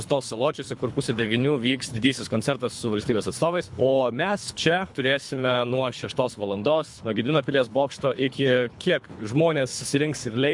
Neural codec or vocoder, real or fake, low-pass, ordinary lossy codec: codec, 24 kHz, 3 kbps, HILCodec; fake; 10.8 kHz; MP3, 64 kbps